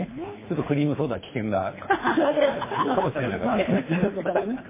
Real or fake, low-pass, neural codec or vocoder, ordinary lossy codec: fake; 3.6 kHz; codec, 24 kHz, 3 kbps, HILCodec; MP3, 16 kbps